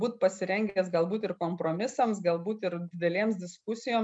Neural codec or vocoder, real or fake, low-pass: none; real; 9.9 kHz